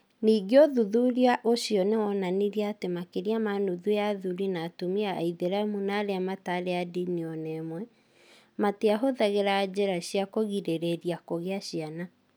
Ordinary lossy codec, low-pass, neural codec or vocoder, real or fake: none; none; none; real